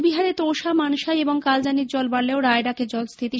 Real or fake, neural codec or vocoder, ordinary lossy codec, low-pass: real; none; none; none